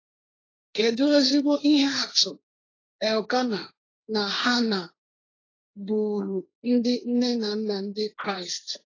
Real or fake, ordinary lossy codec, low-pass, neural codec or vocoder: fake; AAC, 32 kbps; 7.2 kHz; codec, 16 kHz, 1.1 kbps, Voila-Tokenizer